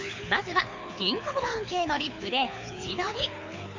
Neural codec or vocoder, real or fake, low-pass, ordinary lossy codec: codec, 24 kHz, 6 kbps, HILCodec; fake; 7.2 kHz; MP3, 48 kbps